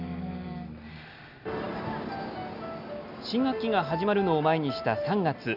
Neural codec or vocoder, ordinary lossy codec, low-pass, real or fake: none; none; 5.4 kHz; real